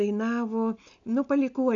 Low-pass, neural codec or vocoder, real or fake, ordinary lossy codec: 7.2 kHz; none; real; AAC, 64 kbps